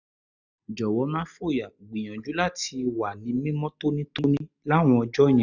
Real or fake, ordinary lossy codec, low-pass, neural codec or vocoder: real; none; 7.2 kHz; none